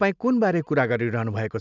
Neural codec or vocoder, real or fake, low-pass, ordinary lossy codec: none; real; 7.2 kHz; none